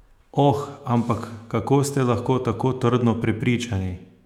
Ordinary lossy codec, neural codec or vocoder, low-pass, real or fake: none; autoencoder, 48 kHz, 128 numbers a frame, DAC-VAE, trained on Japanese speech; 19.8 kHz; fake